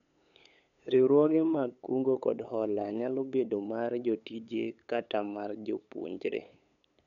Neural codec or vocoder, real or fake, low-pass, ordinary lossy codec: codec, 16 kHz, 8 kbps, FunCodec, trained on LibriTTS, 25 frames a second; fake; 7.2 kHz; none